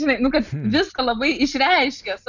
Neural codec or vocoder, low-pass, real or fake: none; 7.2 kHz; real